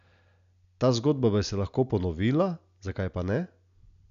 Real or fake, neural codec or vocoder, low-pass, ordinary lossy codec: real; none; 7.2 kHz; none